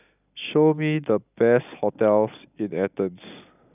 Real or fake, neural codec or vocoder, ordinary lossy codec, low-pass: real; none; none; 3.6 kHz